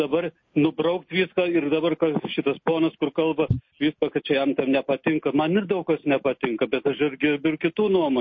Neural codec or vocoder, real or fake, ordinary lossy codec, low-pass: none; real; MP3, 32 kbps; 7.2 kHz